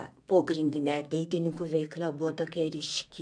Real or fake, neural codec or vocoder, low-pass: fake; codec, 32 kHz, 1.9 kbps, SNAC; 9.9 kHz